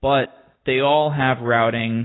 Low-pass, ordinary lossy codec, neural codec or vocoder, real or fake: 7.2 kHz; AAC, 16 kbps; none; real